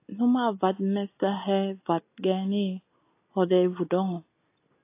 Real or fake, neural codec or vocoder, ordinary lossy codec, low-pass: real; none; AAC, 24 kbps; 3.6 kHz